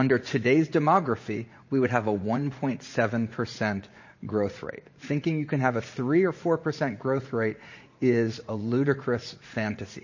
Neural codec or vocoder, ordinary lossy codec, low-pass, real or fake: vocoder, 44.1 kHz, 80 mel bands, Vocos; MP3, 32 kbps; 7.2 kHz; fake